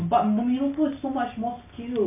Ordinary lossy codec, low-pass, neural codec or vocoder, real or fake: MP3, 32 kbps; 3.6 kHz; none; real